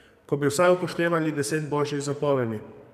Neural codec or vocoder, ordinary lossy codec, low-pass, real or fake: codec, 44.1 kHz, 2.6 kbps, SNAC; none; 14.4 kHz; fake